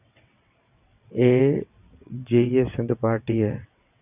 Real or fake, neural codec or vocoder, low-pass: fake; vocoder, 22.05 kHz, 80 mel bands, WaveNeXt; 3.6 kHz